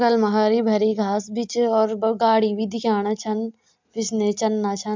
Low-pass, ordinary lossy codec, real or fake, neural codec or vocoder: 7.2 kHz; MP3, 64 kbps; real; none